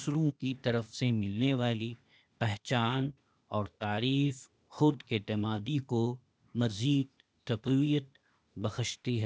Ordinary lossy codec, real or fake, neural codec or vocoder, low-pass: none; fake; codec, 16 kHz, 0.8 kbps, ZipCodec; none